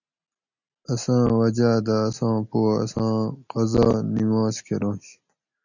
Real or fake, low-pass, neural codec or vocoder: real; 7.2 kHz; none